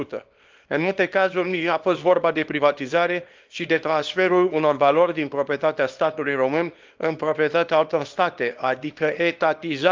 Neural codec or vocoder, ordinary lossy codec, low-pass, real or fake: codec, 24 kHz, 0.9 kbps, WavTokenizer, small release; Opus, 32 kbps; 7.2 kHz; fake